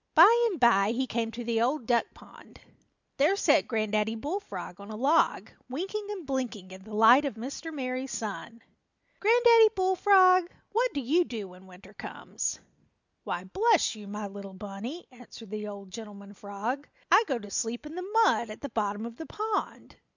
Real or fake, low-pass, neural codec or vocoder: real; 7.2 kHz; none